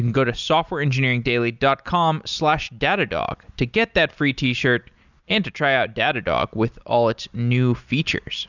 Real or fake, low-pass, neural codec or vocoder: real; 7.2 kHz; none